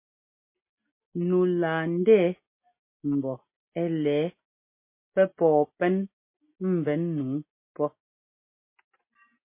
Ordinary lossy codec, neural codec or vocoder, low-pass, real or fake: MP3, 24 kbps; none; 3.6 kHz; real